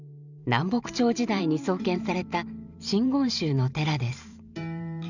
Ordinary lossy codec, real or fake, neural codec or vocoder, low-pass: none; fake; vocoder, 44.1 kHz, 128 mel bands every 512 samples, BigVGAN v2; 7.2 kHz